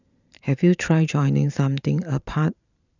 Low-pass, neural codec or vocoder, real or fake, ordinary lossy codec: 7.2 kHz; vocoder, 44.1 kHz, 128 mel bands every 512 samples, BigVGAN v2; fake; none